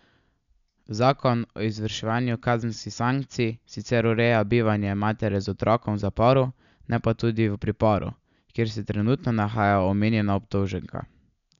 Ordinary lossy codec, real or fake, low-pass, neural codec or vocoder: none; real; 7.2 kHz; none